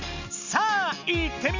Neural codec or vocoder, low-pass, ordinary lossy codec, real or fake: none; 7.2 kHz; none; real